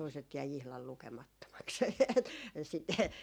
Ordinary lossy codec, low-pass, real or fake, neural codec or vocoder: none; none; real; none